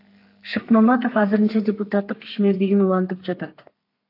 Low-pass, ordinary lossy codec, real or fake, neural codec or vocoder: 5.4 kHz; AAC, 32 kbps; fake; codec, 32 kHz, 1.9 kbps, SNAC